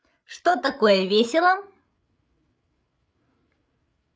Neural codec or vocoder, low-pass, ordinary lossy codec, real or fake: codec, 16 kHz, 16 kbps, FreqCodec, larger model; none; none; fake